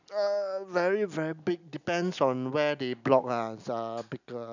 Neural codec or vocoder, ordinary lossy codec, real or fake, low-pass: none; none; real; 7.2 kHz